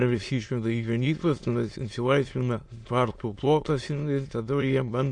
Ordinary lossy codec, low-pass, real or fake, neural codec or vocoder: MP3, 48 kbps; 9.9 kHz; fake; autoencoder, 22.05 kHz, a latent of 192 numbers a frame, VITS, trained on many speakers